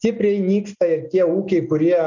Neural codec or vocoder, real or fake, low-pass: none; real; 7.2 kHz